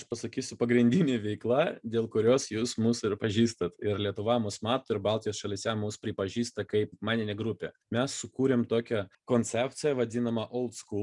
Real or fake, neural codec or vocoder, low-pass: real; none; 10.8 kHz